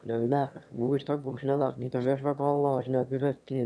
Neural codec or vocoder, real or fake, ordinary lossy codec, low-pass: autoencoder, 22.05 kHz, a latent of 192 numbers a frame, VITS, trained on one speaker; fake; none; none